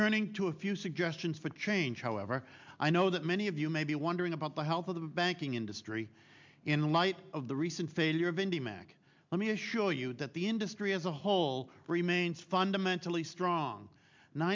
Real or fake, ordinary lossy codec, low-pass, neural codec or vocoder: real; MP3, 64 kbps; 7.2 kHz; none